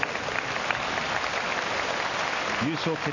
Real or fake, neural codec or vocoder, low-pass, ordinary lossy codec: real; none; 7.2 kHz; none